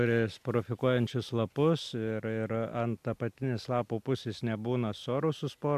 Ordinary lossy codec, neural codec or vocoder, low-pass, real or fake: AAC, 96 kbps; none; 14.4 kHz; real